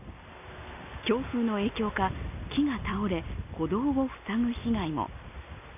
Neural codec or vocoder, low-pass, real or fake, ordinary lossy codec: none; 3.6 kHz; real; none